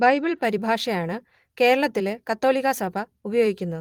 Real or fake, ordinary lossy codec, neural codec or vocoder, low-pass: fake; Opus, 24 kbps; vocoder, 44.1 kHz, 128 mel bands every 256 samples, BigVGAN v2; 19.8 kHz